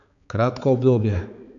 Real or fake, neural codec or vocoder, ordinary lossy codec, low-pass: fake; codec, 16 kHz, 4 kbps, X-Codec, HuBERT features, trained on balanced general audio; none; 7.2 kHz